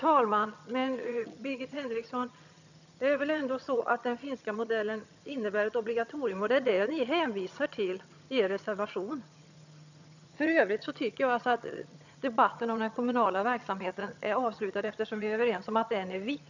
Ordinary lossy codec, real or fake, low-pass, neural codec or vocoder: none; fake; 7.2 kHz; vocoder, 22.05 kHz, 80 mel bands, HiFi-GAN